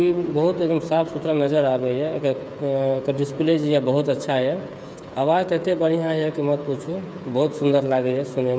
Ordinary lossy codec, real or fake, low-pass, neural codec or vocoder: none; fake; none; codec, 16 kHz, 8 kbps, FreqCodec, smaller model